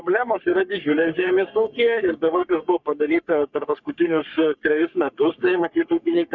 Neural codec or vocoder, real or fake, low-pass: codec, 44.1 kHz, 3.4 kbps, Pupu-Codec; fake; 7.2 kHz